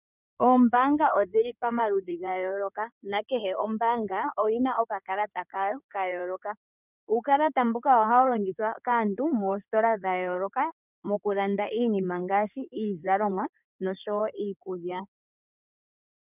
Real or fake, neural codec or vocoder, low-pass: fake; codec, 16 kHz in and 24 kHz out, 2.2 kbps, FireRedTTS-2 codec; 3.6 kHz